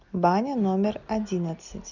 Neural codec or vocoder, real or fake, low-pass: none; real; 7.2 kHz